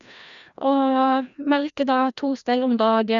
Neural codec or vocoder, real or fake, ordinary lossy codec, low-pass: codec, 16 kHz, 1 kbps, FreqCodec, larger model; fake; none; 7.2 kHz